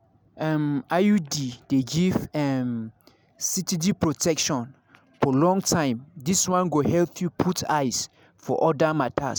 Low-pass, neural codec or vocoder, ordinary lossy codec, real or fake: none; none; none; real